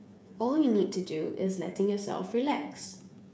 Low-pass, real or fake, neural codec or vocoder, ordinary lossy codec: none; fake; codec, 16 kHz, 8 kbps, FreqCodec, smaller model; none